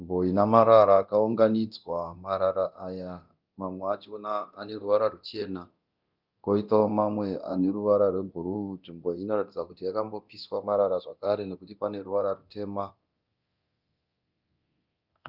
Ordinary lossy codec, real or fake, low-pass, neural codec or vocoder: Opus, 24 kbps; fake; 5.4 kHz; codec, 24 kHz, 0.9 kbps, DualCodec